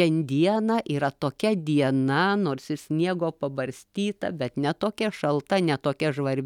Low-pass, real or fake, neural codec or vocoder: 19.8 kHz; real; none